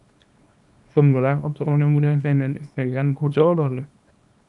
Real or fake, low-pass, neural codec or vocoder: fake; 10.8 kHz; codec, 24 kHz, 0.9 kbps, WavTokenizer, small release